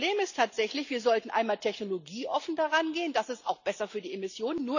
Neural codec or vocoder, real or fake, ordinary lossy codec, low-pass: none; real; none; 7.2 kHz